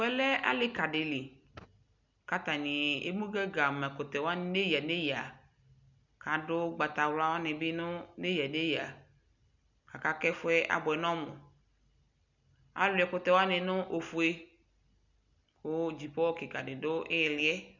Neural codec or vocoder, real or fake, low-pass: none; real; 7.2 kHz